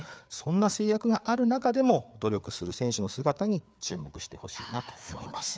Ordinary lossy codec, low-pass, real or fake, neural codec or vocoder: none; none; fake; codec, 16 kHz, 4 kbps, FreqCodec, larger model